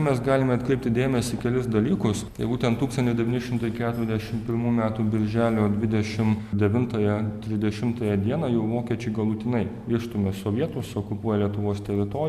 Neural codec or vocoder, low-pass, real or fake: none; 14.4 kHz; real